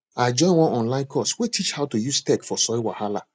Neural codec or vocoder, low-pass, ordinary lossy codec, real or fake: none; none; none; real